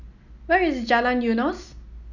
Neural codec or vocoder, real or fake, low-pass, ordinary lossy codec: none; real; 7.2 kHz; none